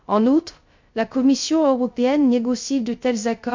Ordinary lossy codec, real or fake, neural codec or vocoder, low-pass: MP3, 48 kbps; fake; codec, 16 kHz, 0.3 kbps, FocalCodec; 7.2 kHz